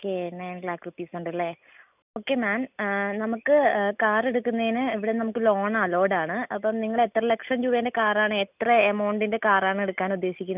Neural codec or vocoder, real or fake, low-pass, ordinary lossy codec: none; real; 3.6 kHz; none